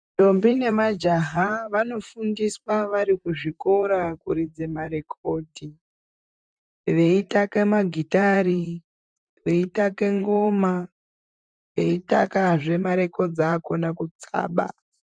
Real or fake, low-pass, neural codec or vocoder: fake; 9.9 kHz; vocoder, 44.1 kHz, 128 mel bands, Pupu-Vocoder